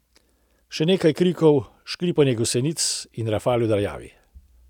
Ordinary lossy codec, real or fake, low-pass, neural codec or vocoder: none; real; 19.8 kHz; none